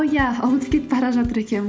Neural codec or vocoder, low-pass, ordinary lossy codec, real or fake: none; none; none; real